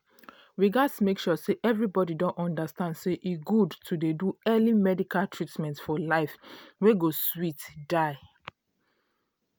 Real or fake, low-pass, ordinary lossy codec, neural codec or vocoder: real; none; none; none